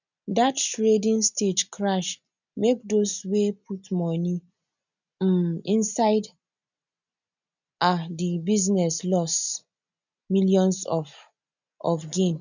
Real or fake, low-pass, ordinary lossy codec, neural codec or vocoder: real; 7.2 kHz; none; none